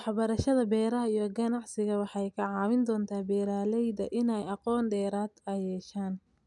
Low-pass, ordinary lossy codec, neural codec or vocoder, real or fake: 10.8 kHz; none; none; real